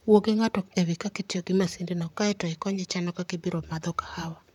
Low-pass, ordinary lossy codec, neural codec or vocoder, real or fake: 19.8 kHz; none; vocoder, 44.1 kHz, 128 mel bands, Pupu-Vocoder; fake